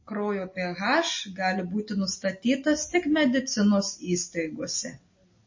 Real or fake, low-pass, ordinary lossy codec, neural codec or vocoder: real; 7.2 kHz; MP3, 32 kbps; none